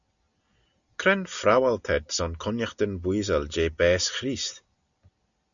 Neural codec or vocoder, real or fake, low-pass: none; real; 7.2 kHz